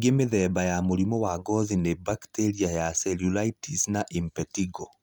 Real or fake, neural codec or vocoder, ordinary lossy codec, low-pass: real; none; none; none